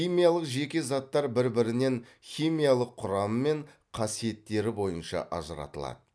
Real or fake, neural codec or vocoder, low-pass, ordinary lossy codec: real; none; none; none